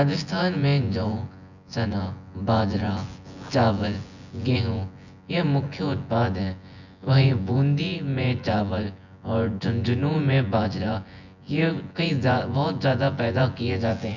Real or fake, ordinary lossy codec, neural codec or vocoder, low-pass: fake; none; vocoder, 24 kHz, 100 mel bands, Vocos; 7.2 kHz